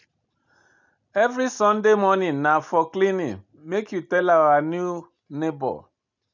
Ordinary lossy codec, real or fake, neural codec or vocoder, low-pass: none; real; none; 7.2 kHz